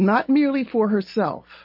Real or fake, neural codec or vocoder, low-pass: real; none; 5.4 kHz